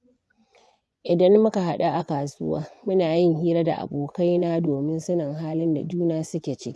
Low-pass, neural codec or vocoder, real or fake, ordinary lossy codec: none; vocoder, 24 kHz, 100 mel bands, Vocos; fake; none